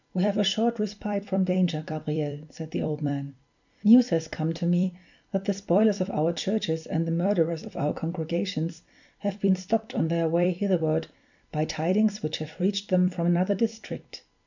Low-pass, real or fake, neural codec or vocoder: 7.2 kHz; fake; vocoder, 44.1 kHz, 128 mel bands every 256 samples, BigVGAN v2